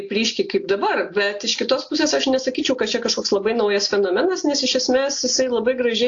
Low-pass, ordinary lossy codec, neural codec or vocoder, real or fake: 7.2 kHz; AAC, 48 kbps; none; real